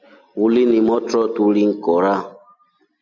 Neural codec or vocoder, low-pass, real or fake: none; 7.2 kHz; real